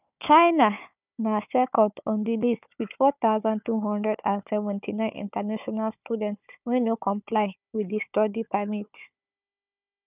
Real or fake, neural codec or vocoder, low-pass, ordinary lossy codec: fake; codec, 16 kHz, 4 kbps, FunCodec, trained on Chinese and English, 50 frames a second; 3.6 kHz; none